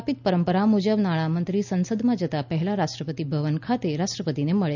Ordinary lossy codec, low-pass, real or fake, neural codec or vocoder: none; 7.2 kHz; real; none